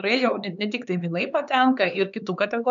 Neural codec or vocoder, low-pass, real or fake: codec, 16 kHz, 4 kbps, X-Codec, HuBERT features, trained on LibriSpeech; 7.2 kHz; fake